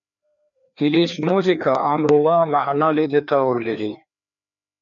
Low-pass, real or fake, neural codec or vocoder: 7.2 kHz; fake; codec, 16 kHz, 2 kbps, FreqCodec, larger model